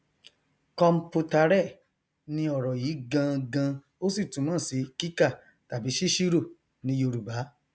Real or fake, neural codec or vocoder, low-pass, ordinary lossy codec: real; none; none; none